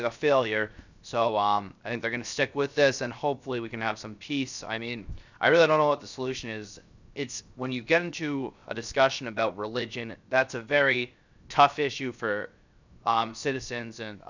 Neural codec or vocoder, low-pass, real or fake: codec, 16 kHz, 0.7 kbps, FocalCodec; 7.2 kHz; fake